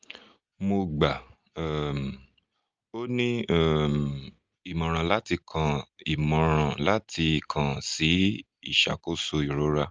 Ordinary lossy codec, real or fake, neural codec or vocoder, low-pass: Opus, 24 kbps; real; none; 7.2 kHz